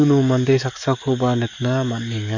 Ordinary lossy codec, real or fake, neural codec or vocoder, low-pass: none; fake; codec, 44.1 kHz, 7.8 kbps, DAC; 7.2 kHz